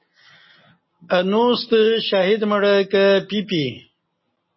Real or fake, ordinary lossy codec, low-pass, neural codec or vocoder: real; MP3, 24 kbps; 7.2 kHz; none